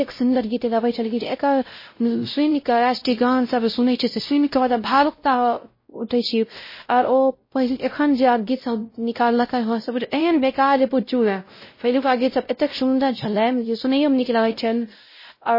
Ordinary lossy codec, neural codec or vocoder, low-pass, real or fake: MP3, 24 kbps; codec, 16 kHz, 0.5 kbps, X-Codec, WavLM features, trained on Multilingual LibriSpeech; 5.4 kHz; fake